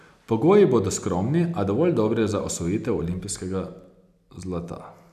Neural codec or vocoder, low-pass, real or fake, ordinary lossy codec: none; 14.4 kHz; real; none